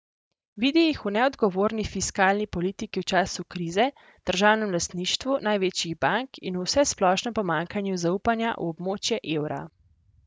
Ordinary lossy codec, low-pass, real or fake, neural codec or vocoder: none; none; real; none